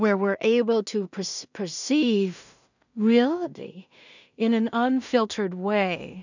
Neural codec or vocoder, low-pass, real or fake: codec, 16 kHz in and 24 kHz out, 0.4 kbps, LongCat-Audio-Codec, two codebook decoder; 7.2 kHz; fake